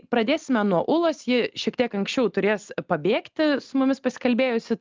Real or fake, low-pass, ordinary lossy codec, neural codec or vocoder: real; 7.2 kHz; Opus, 24 kbps; none